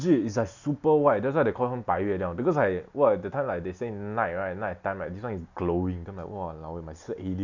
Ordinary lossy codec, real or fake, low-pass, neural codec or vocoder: none; real; 7.2 kHz; none